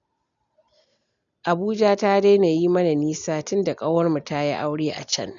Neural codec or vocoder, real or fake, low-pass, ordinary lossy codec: none; real; 7.2 kHz; none